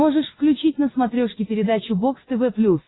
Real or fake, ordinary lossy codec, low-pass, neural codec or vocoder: real; AAC, 16 kbps; 7.2 kHz; none